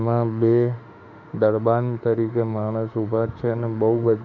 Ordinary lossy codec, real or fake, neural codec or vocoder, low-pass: none; fake; autoencoder, 48 kHz, 32 numbers a frame, DAC-VAE, trained on Japanese speech; 7.2 kHz